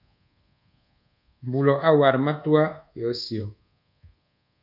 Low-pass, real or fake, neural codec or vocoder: 5.4 kHz; fake; codec, 24 kHz, 1.2 kbps, DualCodec